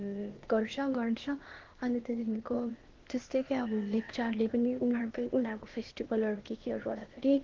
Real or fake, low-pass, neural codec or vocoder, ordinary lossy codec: fake; 7.2 kHz; codec, 16 kHz, 0.8 kbps, ZipCodec; Opus, 16 kbps